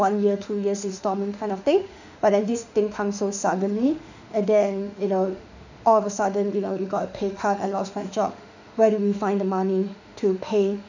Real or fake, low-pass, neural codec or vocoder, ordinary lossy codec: fake; 7.2 kHz; autoencoder, 48 kHz, 32 numbers a frame, DAC-VAE, trained on Japanese speech; none